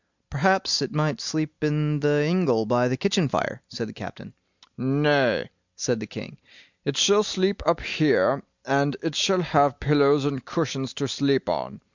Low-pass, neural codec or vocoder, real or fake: 7.2 kHz; none; real